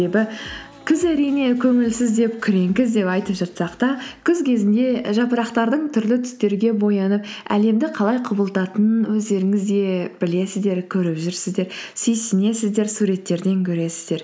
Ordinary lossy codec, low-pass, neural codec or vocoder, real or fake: none; none; none; real